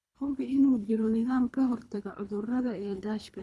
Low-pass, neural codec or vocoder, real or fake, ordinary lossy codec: none; codec, 24 kHz, 3 kbps, HILCodec; fake; none